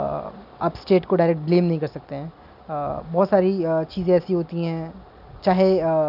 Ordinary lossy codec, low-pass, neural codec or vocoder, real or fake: none; 5.4 kHz; none; real